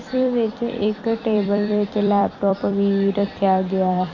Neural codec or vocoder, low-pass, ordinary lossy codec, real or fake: vocoder, 44.1 kHz, 128 mel bands every 256 samples, BigVGAN v2; 7.2 kHz; none; fake